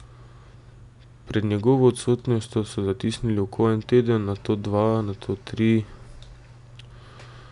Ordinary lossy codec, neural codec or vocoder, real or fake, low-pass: none; none; real; 10.8 kHz